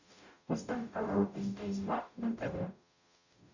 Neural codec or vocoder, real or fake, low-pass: codec, 44.1 kHz, 0.9 kbps, DAC; fake; 7.2 kHz